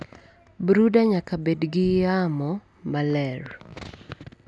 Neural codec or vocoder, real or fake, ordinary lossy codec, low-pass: none; real; none; none